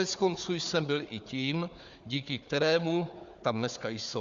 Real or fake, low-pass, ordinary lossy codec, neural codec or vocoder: fake; 7.2 kHz; Opus, 64 kbps; codec, 16 kHz, 4 kbps, FunCodec, trained on Chinese and English, 50 frames a second